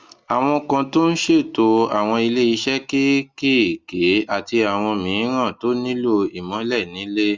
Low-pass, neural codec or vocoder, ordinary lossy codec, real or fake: 7.2 kHz; none; Opus, 32 kbps; real